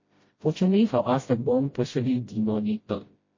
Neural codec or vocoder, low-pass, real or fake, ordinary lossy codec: codec, 16 kHz, 0.5 kbps, FreqCodec, smaller model; 7.2 kHz; fake; MP3, 32 kbps